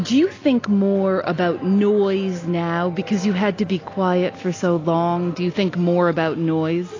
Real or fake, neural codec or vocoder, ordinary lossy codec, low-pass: real; none; AAC, 32 kbps; 7.2 kHz